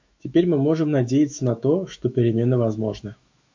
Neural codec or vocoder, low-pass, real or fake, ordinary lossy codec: autoencoder, 48 kHz, 128 numbers a frame, DAC-VAE, trained on Japanese speech; 7.2 kHz; fake; MP3, 48 kbps